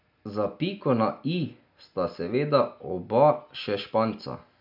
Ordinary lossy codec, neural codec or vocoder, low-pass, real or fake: MP3, 48 kbps; none; 5.4 kHz; real